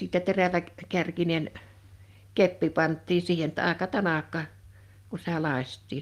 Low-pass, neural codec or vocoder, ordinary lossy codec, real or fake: 14.4 kHz; none; Opus, 32 kbps; real